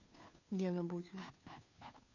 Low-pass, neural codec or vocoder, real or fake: 7.2 kHz; codec, 16 kHz, 2 kbps, FunCodec, trained on Chinese and English, 25 frames a second; fake